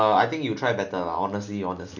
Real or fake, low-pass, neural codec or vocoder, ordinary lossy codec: real; 7.2 kHz; none; none